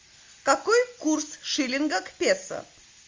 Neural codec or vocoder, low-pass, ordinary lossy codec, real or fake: none; 7.2 kHz; Opus, 32 kbps; real